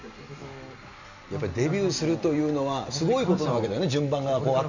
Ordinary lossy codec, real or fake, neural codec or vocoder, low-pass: none; real; none; 7.2 kHz